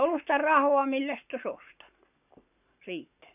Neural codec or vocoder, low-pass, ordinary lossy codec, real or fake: codec, 44.1 kHz, 7.8 kbps, Pupu-Codec; 3.6 kHz; none; fake